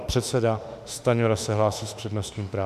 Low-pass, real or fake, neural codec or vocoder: 14.4 kHz; fake; autoencoder, 48 kHz, 32 numbers a frame, DAC-VAE, trained on Japanese speech